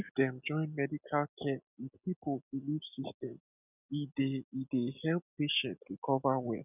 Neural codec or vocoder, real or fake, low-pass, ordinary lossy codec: none; real; 3.6 kHz; none